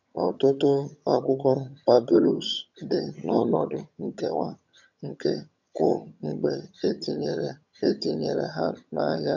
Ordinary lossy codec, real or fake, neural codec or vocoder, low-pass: none; fake; vocoder, 22.05 kHz, 80 mel bands, HiFi-GAN; 7.2 kHz